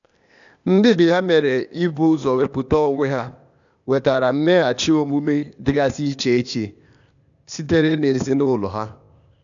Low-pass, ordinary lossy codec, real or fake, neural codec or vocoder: 7.2 kHz; none; fake; codec, 16 kHz, 0.8 kbps, ZipCodec